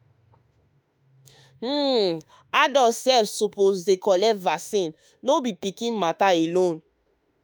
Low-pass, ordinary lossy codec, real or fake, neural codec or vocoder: none; none; fake; autoencoder, 48 kHz, 32 numbers a frame, DAC-VAE, trained on Japanese speech